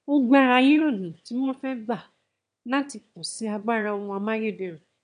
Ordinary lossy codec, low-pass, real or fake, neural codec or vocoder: none; 9.9 kHz; fake; autoencoder, 22.05 kHz, a latent of 192 numbers a frame, VITS, trained on one speaker